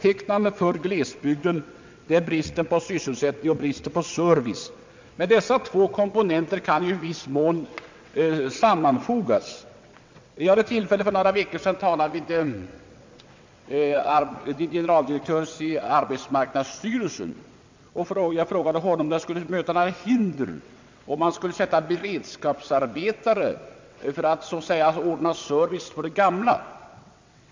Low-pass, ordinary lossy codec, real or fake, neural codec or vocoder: 7.2 kHz; MP3, 64 kbps; fake; vocoder, 22.05 kHz, 80 mel bands, WaveNeXt